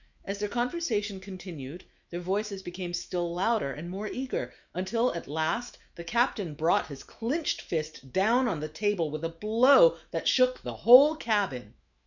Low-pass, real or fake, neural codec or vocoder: 7.2 kHz; fake; autoencoder, 48 kHz, 128 numbers a frame, DAC-VAE, trained on Japanese speech